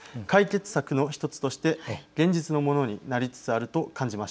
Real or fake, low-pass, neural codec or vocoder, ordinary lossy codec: real; none; none; none